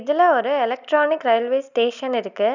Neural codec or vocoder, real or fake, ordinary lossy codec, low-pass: none; real; none; 7.2 kHz